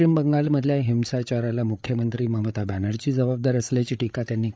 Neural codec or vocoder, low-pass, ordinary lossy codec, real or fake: codec, 16 kHz, 8 kbps, FreqCodec, larger model; none; none; fake